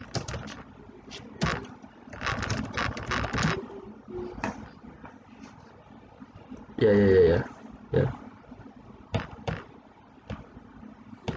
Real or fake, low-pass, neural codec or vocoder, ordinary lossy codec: real; none; none; none